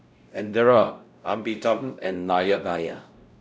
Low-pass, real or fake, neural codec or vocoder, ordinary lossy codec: none; fake; codec, 16 kHz, 0.5 kbps, X-Codec, WavLM features, trained on Multilingual LibriSpeech; none